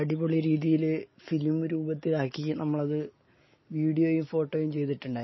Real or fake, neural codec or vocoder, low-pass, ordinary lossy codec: real; none; 7.2 kHz; MP3, 24 kbps